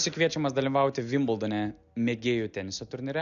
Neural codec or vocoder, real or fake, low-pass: none; real; 7.2 kHz